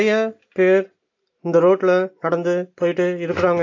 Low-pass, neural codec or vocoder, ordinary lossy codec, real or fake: 7.2 kHz; none; AAC, 48 kbps; real